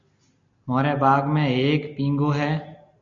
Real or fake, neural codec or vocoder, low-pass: real; none; 7.2 kHz